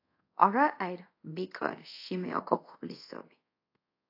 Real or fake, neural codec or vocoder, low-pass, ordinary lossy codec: fake; codec, 24 kHz, 0.5 kbps, DualCodec; 5.4 kHz; AAC, 32 kbps